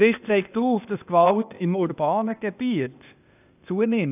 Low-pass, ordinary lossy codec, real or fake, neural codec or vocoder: 3.6 kHz; none; fake; codec, 16 kHz, 0.8 kbps, ZipCodec